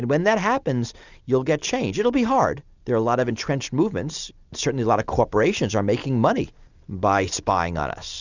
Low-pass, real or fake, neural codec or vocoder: 7.2 kHz; real; none